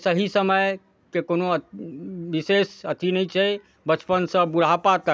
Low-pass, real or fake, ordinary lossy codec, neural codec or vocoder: none; real; none; none